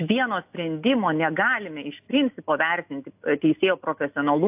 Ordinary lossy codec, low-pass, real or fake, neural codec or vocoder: AAC, 32 kbps; 3.6 kHz; real; none